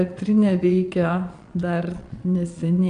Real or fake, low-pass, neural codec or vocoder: fake; 9.9 kHz; vocoder, 22.05 kHz, 80 mel bands, Vocos